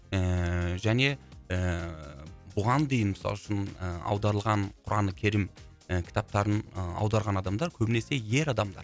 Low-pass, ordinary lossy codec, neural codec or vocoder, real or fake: none; none; none; real